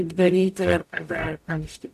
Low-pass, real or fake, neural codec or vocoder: 14.4 kHz; fake; codec, 44.1 kHz, 0.9 kbps, DAC